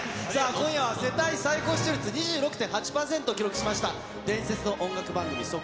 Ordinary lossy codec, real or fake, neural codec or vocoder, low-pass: none; real; none; none